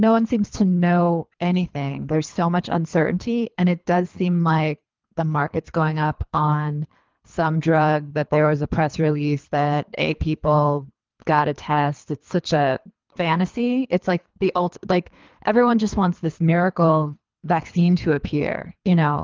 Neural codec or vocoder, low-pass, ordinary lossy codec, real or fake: codec, 24 kHz, 3 kbps, HILCodec; 7.2 kHz; Opus, 24 kbps; fake